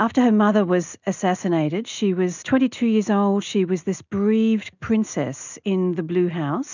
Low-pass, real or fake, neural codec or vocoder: 7.2 kHz; real; none